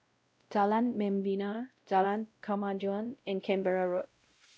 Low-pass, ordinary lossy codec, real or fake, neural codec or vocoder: none; none; fake; codec, 16 kHz, 0.5 kbps, X-Codec, WavLM features, trained on Multilingual LibriSpeech